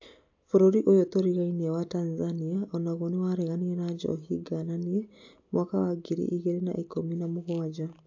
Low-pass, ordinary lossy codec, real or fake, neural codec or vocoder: 7.2 kHz; AAC, 48 kbps; real; none